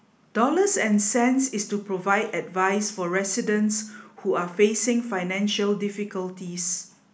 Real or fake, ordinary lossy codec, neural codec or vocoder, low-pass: real; none; none; none